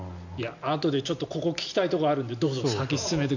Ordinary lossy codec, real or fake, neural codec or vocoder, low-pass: none; real; none; 7.2 kHz